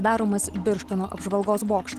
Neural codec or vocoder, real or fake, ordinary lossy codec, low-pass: none; real; Opus, 16 kbps; 14.4 kHz